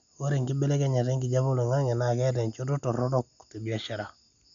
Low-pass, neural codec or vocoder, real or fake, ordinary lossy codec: 7.2 kHz; none; real; none